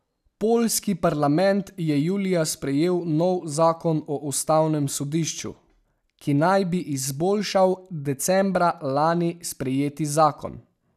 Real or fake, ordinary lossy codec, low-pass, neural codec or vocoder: real; none; 14.4 kHz; none